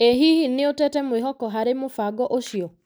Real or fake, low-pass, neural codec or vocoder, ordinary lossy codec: real; none; none; none